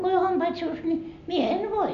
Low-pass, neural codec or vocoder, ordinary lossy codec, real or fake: 7.2 kHz; none; none; real